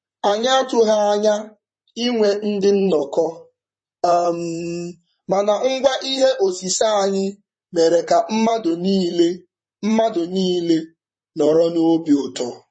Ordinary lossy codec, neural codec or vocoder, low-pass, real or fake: MP3, 32 kbps; vocoder, 44.1 kHz, 128 mel bands, Pupu-Vocoder; 9.9 kHz; fake